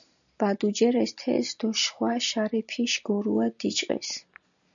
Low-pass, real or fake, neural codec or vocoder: 7.2 kHz; real; none